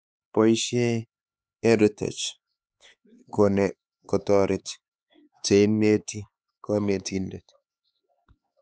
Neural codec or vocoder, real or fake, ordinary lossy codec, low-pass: codec, 16 kHz, 4 kbps, X-Codec, HuBERT features, trained on LibriSpeech; fake; none; none